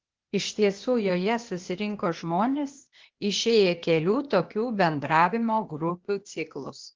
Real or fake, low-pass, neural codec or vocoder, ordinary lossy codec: fake; 7.2 kHz; codec, 16 kHz, 0.8 kbps, ZipCodec; Opus, 16 kbps